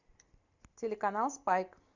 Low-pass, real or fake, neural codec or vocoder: 7.2 kHz; real; none